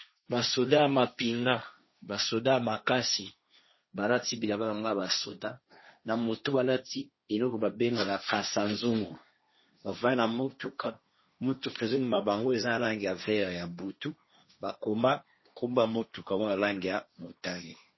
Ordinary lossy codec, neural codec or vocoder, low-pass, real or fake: MP3, 24 kbps; codec, 16 kHz, 1.1 kbps, Voila-Tokenizer; 7.2 kHz; fake